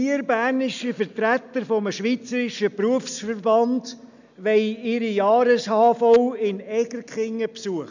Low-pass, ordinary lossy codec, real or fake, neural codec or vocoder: 7.2 kHz; none; real; none